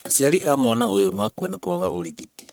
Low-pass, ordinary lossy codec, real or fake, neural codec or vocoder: none; none; fake; codec, 44.1 kHz, 1.7 kbps, Pupu-Codec